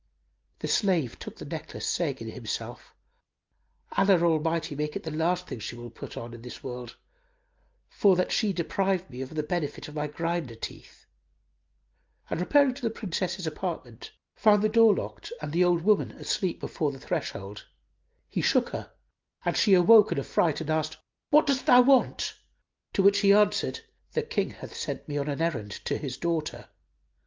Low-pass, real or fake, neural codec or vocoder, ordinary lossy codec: 7.2 kHz; real; none; Opus, 32 kbps